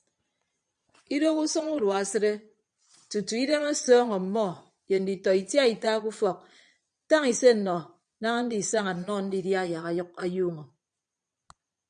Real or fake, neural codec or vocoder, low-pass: fake; vocoder, 22.05 kHz, 80 mel bands, Vocos; 9.9 kHz